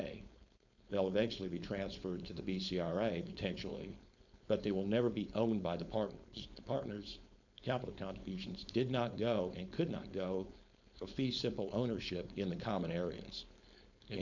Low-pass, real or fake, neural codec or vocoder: 7.2 kHz; fake; codec, 16 kHz, 4.8 kbps, FACodec